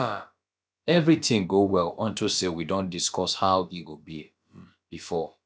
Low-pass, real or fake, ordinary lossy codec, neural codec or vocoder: none; fake; none; codec, 16 kHz, about 1 kbps, DyCAST, with the encoder's durations